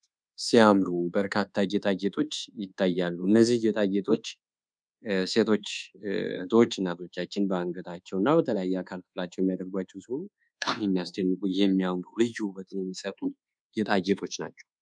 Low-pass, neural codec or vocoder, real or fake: 9.9 kHz; codec, 24 kHz, 1.2 kbps, DualCodec; fake